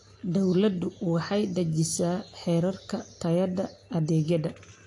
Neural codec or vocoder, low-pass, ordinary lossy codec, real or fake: none; 10.8 kHz; AAC, 48 kbps; real